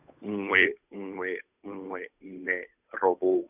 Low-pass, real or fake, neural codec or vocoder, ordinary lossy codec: 3.6 kHz; fake; codec, 16 kHz, 8 kbps, FunCodec, trained on Chinese and English, 25 frames a second; none